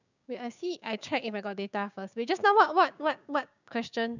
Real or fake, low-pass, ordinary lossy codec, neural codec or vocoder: fake; 7.2 kHz; none; codec, 16 kHz, 6 kbps, DAC